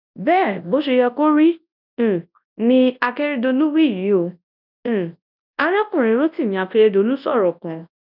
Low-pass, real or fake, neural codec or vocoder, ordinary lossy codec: 5.4 kHz; fake; codec, 24 kHz, 0.9 kbps, WavTokenizer, large speech release; none